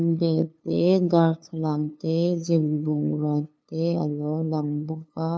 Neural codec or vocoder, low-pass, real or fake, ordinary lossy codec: codec, 16 kHz, 2 kbps, FunCodec, trained on LibriTTS, 25 frames a second; none; fake; none